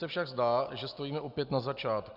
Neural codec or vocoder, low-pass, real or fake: none; 5.4 kHz; real